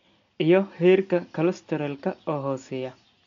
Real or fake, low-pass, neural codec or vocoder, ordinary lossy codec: real; 7.2 kHz; none; MP3, 64 kbps